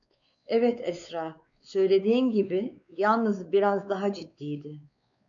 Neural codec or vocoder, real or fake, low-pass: codec, 16 kHz, 4 kbps, X-Codec, WavLM features, trained on Multilingual LibriSpeech; fake; 7.2 kHz